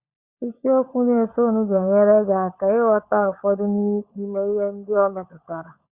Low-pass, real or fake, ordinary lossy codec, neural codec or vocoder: 3.6 kHz; fake; Opus, 64 kbps; codec, 16 kHz, 16 kbps, FunCodec, trained on LibriTTS, 50 frames a second